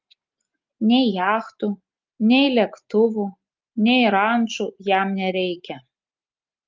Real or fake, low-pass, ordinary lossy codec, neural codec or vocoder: real; 7.2 kHz; Opus, 32 kbps; none